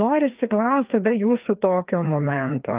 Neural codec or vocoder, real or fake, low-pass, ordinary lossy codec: codec, 16 kHz, 2 kbps, FreqCodec, larger model; fake; 3.6 kHz; Opus, 32 kbps